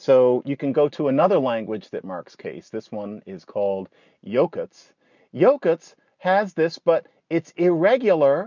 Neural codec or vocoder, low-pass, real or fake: none; 7.2 kHz; real